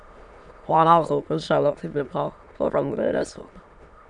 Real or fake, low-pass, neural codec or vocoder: fake; 9.9 kHz; autoencoder, 22.05 kHz, a latent of 192 numbers a frame, VITS, trained on many speakers